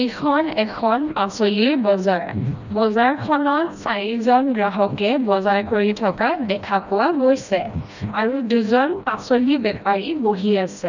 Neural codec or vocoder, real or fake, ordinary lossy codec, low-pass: codec, 16 kHz, 1 kbps, FreqCodec, smaller model; fake; none; 7.2 kHz